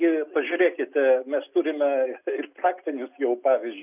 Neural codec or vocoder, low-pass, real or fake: none; 3.6 kHz; real